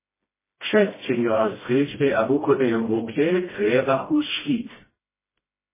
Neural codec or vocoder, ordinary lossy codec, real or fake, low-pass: codec, 16 kHz, 1 kbps, FreqCodec, smaller model; MP3, 16 kbps; fake; 3.6 kHz